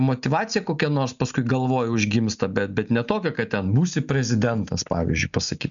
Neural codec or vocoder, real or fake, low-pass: none; real; 7.2 kHz